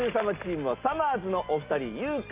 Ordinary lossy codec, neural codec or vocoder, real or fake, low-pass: Opus, 24 kbps; none; real; 3.6 kHz